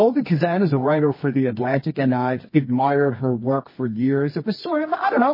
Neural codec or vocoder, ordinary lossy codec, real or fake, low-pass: codec, 24 kHz, 0.9 kbps, WavTokenizer, medium music audio release; MP3, 24 kbps; fake; 5.4 kHz